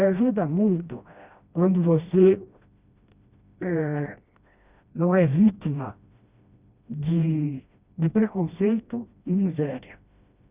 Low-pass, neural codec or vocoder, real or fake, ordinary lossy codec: 3.6 kHz; codec, 16 kHz, 1 kbps, FreqCodec, smaller model; fake; Opus, 64 kbps